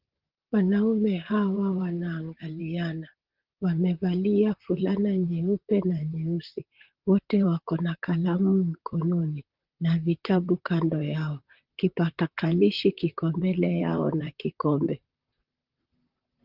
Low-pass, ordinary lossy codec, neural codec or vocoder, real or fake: 5.4 kHz; Opus, 32 kbps; vocoder, 44.1 kHz, 128 mel bands, Pupu-Vocoder; fake